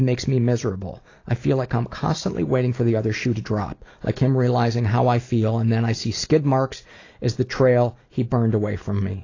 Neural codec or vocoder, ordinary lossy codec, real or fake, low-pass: none; AAC, 32 kbps; real; 7.2 kHz